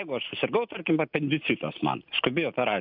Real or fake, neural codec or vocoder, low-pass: real; none; 5.4 kHz